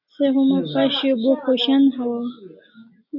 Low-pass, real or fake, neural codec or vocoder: 5.4 kHz; real; none